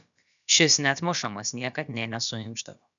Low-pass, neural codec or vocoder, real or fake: 7.2 kHz; codec, 16 kHz, about 1 kbps, DyCAST, with the encoder's durations; fake